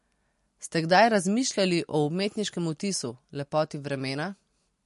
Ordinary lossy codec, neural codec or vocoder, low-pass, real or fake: MP3, 48 kbps; none; 14.4 kHz; real